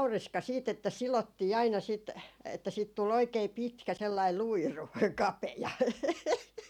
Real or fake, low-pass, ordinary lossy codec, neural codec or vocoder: real; 19.8 kHz; none; none